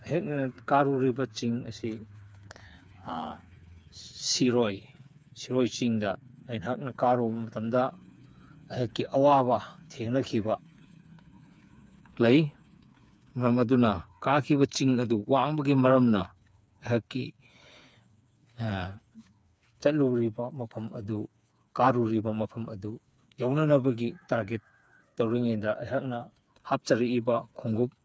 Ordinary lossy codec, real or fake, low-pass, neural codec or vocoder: none; fake; none; codec, 16 kHz, 4 kbps, FreqCodec, smaller model